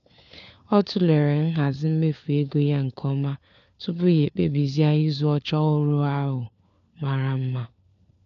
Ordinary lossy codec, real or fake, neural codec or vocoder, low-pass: MP3, 64 kbps; fake; codec, 16 kHz, 4 kbps, FunCodec, trained on LibriTTS, 50 frames a second; 7.2 kHz